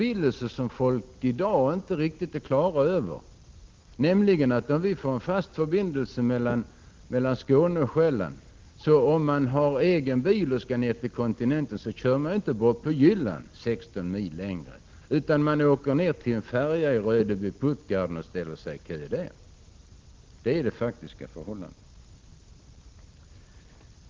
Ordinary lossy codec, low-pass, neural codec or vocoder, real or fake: Opus, 16 kbps; 7.2 kHz; none; real